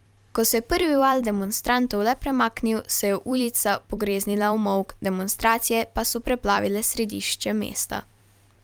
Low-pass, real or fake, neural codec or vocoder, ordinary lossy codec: 19.8 kHz; fake; vocoder, 44.1 kHz, 128 mel bands every 256 samples, BigVGAN v2; Opus, 32 kbps